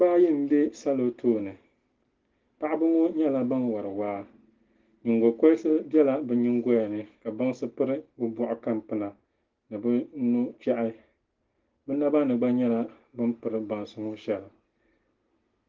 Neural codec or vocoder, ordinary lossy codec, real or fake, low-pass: none; Opus, 16 kbps; real; 7.2 kHz